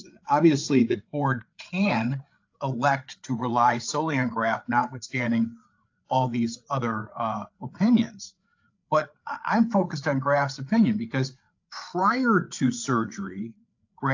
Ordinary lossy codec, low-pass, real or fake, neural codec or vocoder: AAC, 48 kbps; 7.2 kHz; fake; codec, 16 kHz, 8 kbps, FreqCodec, larger model